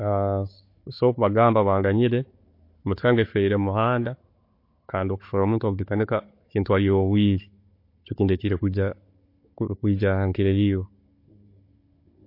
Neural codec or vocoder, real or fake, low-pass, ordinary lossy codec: codec, 16 kHz, 16 kbps, FunCodec, trained on Chinese and English, 50 frames a second; fake; 5.4 kHz; MP3, 32 kbps